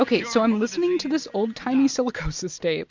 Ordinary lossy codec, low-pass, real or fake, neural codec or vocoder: MP3, 64 kbps; 7.2 kHz; real; none